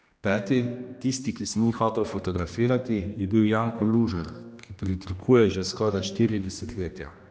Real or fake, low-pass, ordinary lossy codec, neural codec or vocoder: fake; none; none; codec, 16 kHz, 1 kbps, X-Codec, HuBERT features, trained on general audio